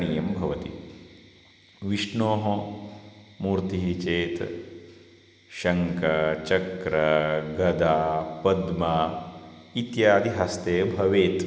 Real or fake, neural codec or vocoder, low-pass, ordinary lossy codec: real; none; none; none